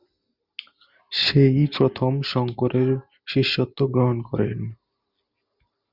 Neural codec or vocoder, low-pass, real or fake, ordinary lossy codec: none; 5.4 kHz; real; Opus, 64 kbps